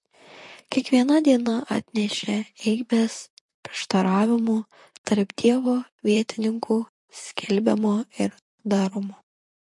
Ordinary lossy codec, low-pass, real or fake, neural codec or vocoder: MP3, 48 kbps; 10.8 kHz; fake; vocoder, 44.1 kHz, 128 mel bands, Pupu-Vocoder